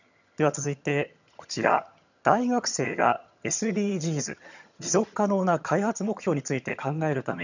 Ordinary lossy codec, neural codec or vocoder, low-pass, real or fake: none; vocoder, 22.05 kHz, 80 mel bands, HiFi-GAN; 7.2 kHz; fake